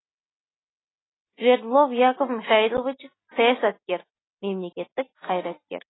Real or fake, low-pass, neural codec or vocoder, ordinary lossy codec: real; 7.2 kHz; none; AAC, 16 kbps